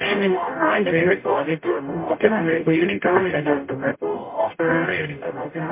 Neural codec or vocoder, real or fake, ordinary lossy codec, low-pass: codec, 44.1 kHz, 0.9 kbps, DAC; fake; MP3, 24 kbps; 3.6 kHz